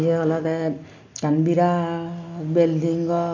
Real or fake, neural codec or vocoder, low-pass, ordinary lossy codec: real; none; 7.2 kHz; none